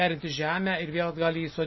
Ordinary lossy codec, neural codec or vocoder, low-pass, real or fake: MP3, 24 kbps; none; 7.2 kHz; real